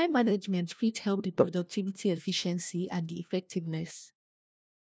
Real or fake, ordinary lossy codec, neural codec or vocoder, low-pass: fake; none; codec, 16 kHz, 1 kbps, FunCodec, trained on LibriTTS, 50 frames a second; none